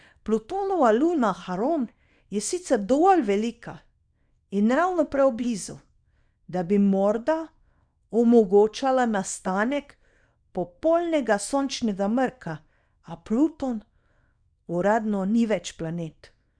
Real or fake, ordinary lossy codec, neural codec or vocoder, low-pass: fake; none; codec, 24 kHz, 0.9 kbps, WavTokenizer, small release; 9.9 kHz